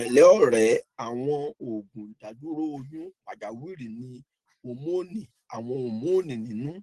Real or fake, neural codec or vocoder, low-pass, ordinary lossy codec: real; none; 10.8 kHz; Opus, 16 kbps